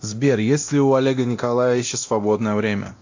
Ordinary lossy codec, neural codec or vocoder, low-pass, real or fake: AAC, 48 kbps; codec, 24 kHz, 0.9 kbps, DualCodec; 7.2 kHz; fake